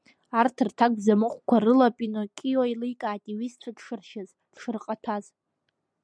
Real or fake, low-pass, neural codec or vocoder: real; 9.9 kHz; none